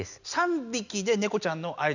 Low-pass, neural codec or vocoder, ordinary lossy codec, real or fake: 7.2 kHz; none; none; real